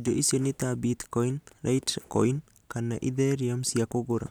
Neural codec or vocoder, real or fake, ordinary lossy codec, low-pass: none; real; none; none